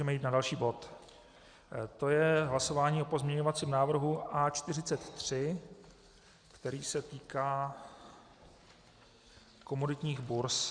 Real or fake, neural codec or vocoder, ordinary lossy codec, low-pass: real; none; Opus, 64 kbps; 9.9 kHz